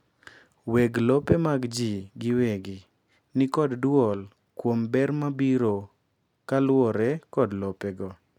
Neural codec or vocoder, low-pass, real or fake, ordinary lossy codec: none; 19.8 kHz; real; none